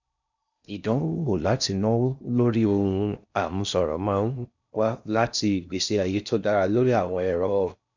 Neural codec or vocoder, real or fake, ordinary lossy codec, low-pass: codec, 16 kHz in and 24 kHz out, 0.6 kbps, FocalCodec, streaming, 4096 codes; fake; none; 7.2 kHz